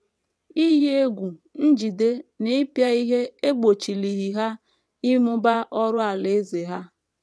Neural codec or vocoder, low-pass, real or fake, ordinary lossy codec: vocoder, 22.05 kHz, 80 mel bands, WaveNeXt; none; fake; none